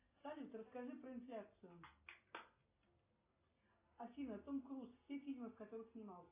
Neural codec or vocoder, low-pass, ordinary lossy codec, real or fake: none; 3.6 kHz; AAC, 24 kbps; real